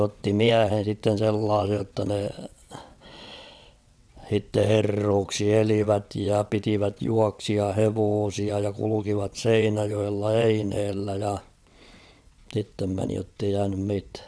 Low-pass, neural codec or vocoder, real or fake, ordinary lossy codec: none; vocoder, 22.05 kHz, 80 mel bands, WaveNeXt; fake; none